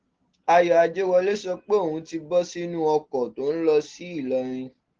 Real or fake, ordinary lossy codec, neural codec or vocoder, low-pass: real; Opus, 16 kbps; none; 7.2 kHz